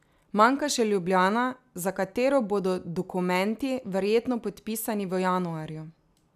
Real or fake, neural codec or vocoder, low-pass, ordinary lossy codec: real; none; 14.4 kHz; none